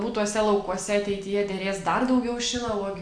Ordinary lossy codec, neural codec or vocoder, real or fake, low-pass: AAC, 64 kbps; none; real; 9.9 kHz